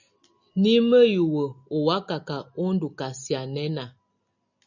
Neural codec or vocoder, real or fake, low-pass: none; real; 7.2 kHz